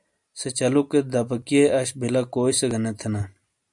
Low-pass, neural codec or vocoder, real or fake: 10.8 kHz; none; real